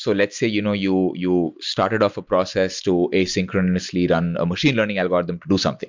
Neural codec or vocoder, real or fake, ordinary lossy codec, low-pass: none; real; MP3, 64 kbps; 7.2 kHz